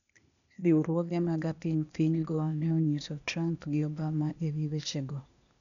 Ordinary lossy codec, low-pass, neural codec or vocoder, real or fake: none; 7.2 kHz; codec, 16 kHz, 0.8 kbps, ZipCodec; fake